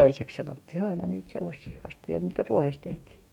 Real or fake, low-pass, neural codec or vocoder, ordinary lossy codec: fake; 19.8 kHz; codec, 44.1 kHz, 2.6 kbps, DAC; MP3, 96 kbps